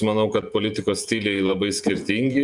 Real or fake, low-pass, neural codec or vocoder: fake; 10.8 kHz; vocoder, 48 kHz, 128 mel bands, Vocos